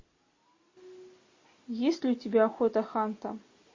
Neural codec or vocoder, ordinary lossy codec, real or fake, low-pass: none; MP3, 32 kbps; real; 7.2 kHz